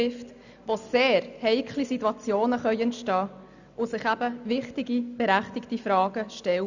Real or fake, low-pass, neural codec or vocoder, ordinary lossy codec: real; 7.2 kHz; none; none